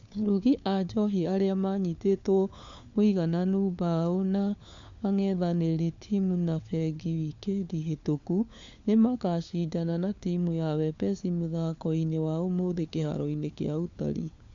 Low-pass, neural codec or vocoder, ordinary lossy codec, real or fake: 7.2 kHz; codec, 16 kHz, 4 kbps, FunCodec, trained on LibriTTS, 50 frames a second; AAC, 64 kbps; fake